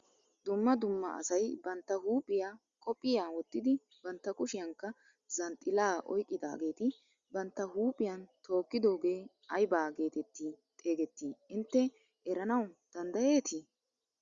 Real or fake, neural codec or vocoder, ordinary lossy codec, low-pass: real; none; Opus, 64 kbps; 7.2 kHz